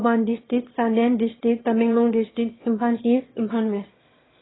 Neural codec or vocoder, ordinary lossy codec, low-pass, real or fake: autoencoder, 22.05 kHz, a latent of 192 numbers a frame, VITS, trained on one speaker; AAC, 16 kbps; 7.2 kHz; fake